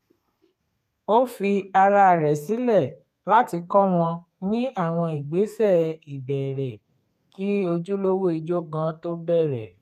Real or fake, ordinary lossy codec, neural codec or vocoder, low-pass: fake; none; codec, 32 kHz, 1.9 kbps, SNAC; 14.4 kHz